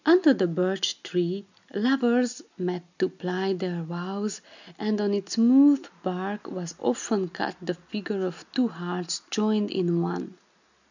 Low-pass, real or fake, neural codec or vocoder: 7.2 kHz; real; none